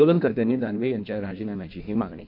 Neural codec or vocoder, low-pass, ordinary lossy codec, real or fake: codec, 16 kHz in and 24 kHz out, 1.1 kbps, FireRedTTS-2 codec; 5.4 kHz; MP3, 48 kbps; fake